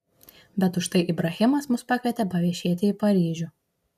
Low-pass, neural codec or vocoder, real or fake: 14.4 kHz; none; real